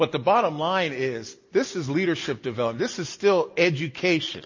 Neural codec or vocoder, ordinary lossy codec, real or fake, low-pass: none; MP3, 32 kbps; real; 7.2 kHz